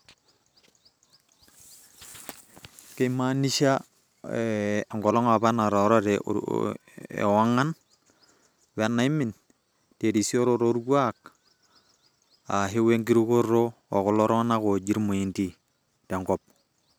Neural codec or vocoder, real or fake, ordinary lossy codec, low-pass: none; real; none; none